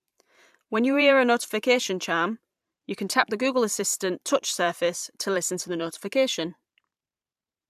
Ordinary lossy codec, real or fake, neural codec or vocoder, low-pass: AAC, 96 kbps; fake; vocoder, 44.1 kHz, 128 mel bands every 512 samples, BigVGAN v2; 14.4 kHz